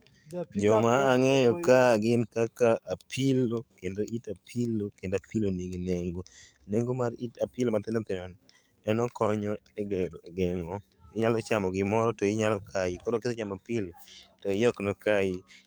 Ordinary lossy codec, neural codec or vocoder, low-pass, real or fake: none; codec, 44.1 kHz, 7.8 kbps, DAC; none; fake